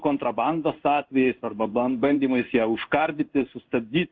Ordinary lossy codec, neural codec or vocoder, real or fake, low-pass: Opus, 32 kbps; codec, 16 kHz in and 24 kHz out, 1 kbps, XY-Tokenizer; fake; 7.2 kHz